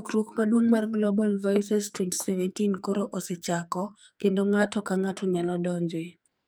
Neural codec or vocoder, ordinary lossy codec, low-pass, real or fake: codec, 44.1 kHz, 2.6 kbps, SNAC; none; none; fake